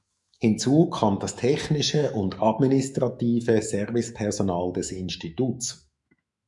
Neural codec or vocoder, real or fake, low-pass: autoencoder, 48 kHz, 128 numbers a frame, DAC-VAE, trained on Japanese speech; fake; 10.8 kHz